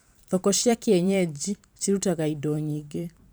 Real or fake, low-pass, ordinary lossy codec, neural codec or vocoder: fake; none; none; vocoder, 44.1 kHz, 128 mel bands every 512 samples, BigVGAN v2